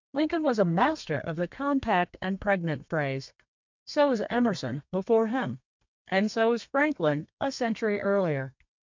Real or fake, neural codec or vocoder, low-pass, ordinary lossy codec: fake; codec, 32 kHz, 1.9 kbps, SNAC; 7.2 kHz; MP3, 64 kbps